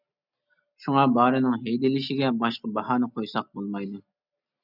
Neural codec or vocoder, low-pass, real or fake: none; 5.4 kHz; real